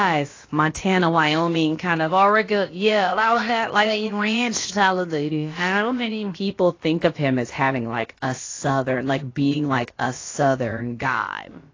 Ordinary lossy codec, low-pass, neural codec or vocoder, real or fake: AAC, 32 kbps; 7.2 kHz; codec, 16 kHz, about 1 kbps, DyCAST, with the encoder's durations; fake